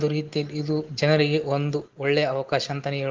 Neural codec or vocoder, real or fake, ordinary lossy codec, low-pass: none; real; Opus, 16 kbps; 7.2 kHz